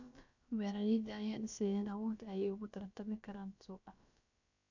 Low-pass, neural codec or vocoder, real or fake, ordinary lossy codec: 7.2 kHz; codec, 16 kHz, about 1 kbps, DyCAST, with the encoder's durations; fake; none